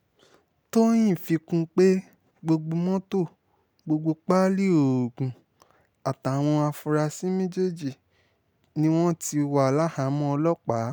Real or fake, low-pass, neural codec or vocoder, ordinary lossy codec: real; none; none; none